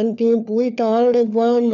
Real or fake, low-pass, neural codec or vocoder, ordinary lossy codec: fake; 7.2 kHz; codec, 16 kHz, 4 kbps, FunCodec, trained on LibriTTS, 50 frames a second; none